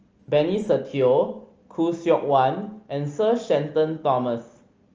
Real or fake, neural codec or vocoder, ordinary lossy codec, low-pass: real; none; Opus, 24 kbps; 7.2 kHz